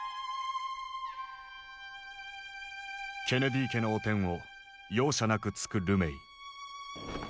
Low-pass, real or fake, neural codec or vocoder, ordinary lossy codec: none; real; none; none